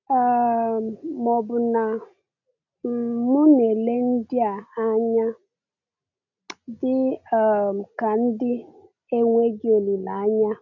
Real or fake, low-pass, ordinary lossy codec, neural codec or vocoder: real; 7.2 kHz; none; none